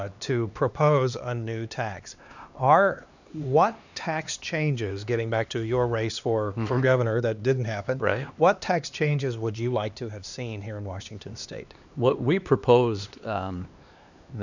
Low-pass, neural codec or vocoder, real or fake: 7.2 kHz; codec, 16 kHz, 2 kbps, X-Codec, HuBERT features, trained on LibriSpeech; fake